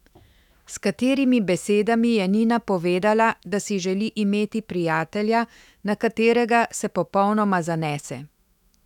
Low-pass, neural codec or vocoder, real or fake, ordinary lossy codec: 19.8 kHz; autoencoder, 48 kHz, 128 numbers a frame, DAC-VAE, trained on Japanese speech; fake; none